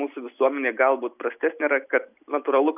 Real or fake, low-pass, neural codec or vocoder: real; 3.6 kHz; none